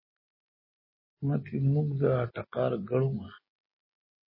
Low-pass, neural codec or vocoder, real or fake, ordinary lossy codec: 5.4 kHz; none; real; MP3, 24 kbps